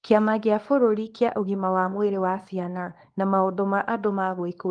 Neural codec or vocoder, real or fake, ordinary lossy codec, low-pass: codec, 24 kHz, 0.9 kbps, WavTokenizer, small release; fake; Opus, 24 kbps; 9.9 kHz